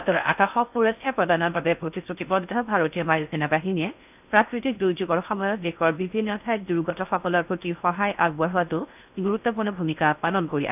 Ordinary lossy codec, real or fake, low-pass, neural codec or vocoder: none; fake; 3.6 kHz; codec, 16 kHz in and 24 kHz out, 0.6 kbps, FocalCodec, streaming, 4096 codes